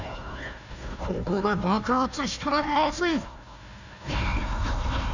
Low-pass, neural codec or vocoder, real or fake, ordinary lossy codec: 7.2 kHz; codec, 16 kHz, 1 kbps, FunCodec, trained on Chinese and English, 50 frames a second; fake; none